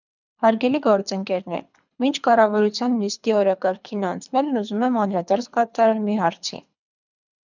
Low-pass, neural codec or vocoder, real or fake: 7.2 kHz; codec, 24 kHz, 3 kbps, HILCodec; fake